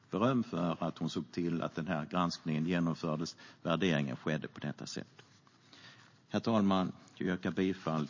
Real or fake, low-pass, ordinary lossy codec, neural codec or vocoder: real; 7.2 kHz; MP3, 32 kbps; none